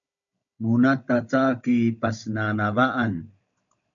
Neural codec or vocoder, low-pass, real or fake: codec, 16 kHz, 16 kbps, FunCodec, trained on Chinese and English, 50 frames a second; 7.2 kHz; fake